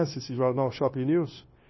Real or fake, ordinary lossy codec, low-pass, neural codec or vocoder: fake; MP3, 24 kbps; 7.2 kHz; codec, 16 kHz, 0.9 kbps, LongCat-Audio-Codec